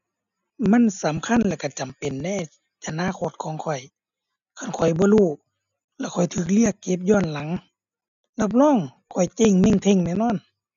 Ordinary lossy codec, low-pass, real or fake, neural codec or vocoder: none; 7.2 kHz; real; none